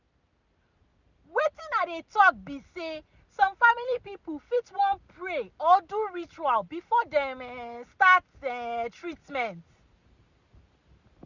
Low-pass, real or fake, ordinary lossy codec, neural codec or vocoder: 7.2 kHz; real; none; none